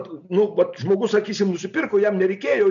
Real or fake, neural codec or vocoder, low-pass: real; none; 7.2 kHz